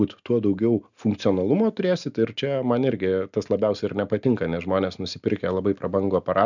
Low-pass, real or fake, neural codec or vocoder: 7.2 kHz; real; none